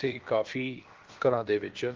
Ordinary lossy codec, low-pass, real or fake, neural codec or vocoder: Opus, 16 kbps; 7.2 kHz; fake; codec, 16 kHz, 0.7 kbps, FocalCodec